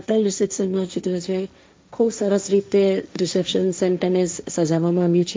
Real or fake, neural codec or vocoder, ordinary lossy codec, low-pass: fake; codec, 16 kHz, 1.1 kbps, Voila-Tokenizer; none; none